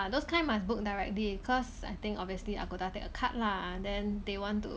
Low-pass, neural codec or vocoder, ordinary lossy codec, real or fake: none; none; none; real